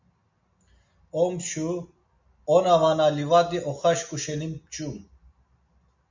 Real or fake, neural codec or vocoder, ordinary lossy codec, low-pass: real; none; MP3, 64 kbps; 7.2 kHz